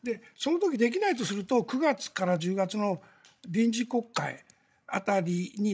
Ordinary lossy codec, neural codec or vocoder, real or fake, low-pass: none; codec, 16 kHz, 16 kbps, FreqCodec, larger model; fake; none